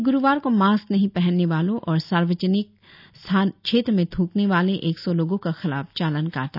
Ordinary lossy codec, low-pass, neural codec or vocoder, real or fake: none; 5.4 kHz; none; real